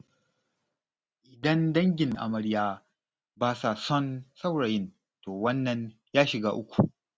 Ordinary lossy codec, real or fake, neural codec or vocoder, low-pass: none; real; none; none